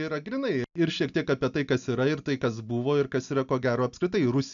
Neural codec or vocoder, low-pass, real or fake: none; 7.2 kHz; real